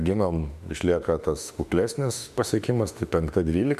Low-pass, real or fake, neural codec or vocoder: 14.4 kHz; fake; autoencoder, 48 kHz, 32 numbers a frame, DAC-VAE, trained on Japanese speech